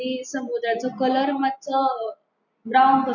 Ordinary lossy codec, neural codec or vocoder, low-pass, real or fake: none; none; 7.2 kHz; real